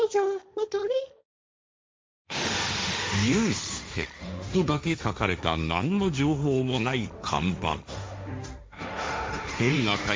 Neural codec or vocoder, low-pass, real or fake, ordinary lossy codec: codec, 16 kHz, 1.1 kbps, Voila-Tokenizer; none; fake; none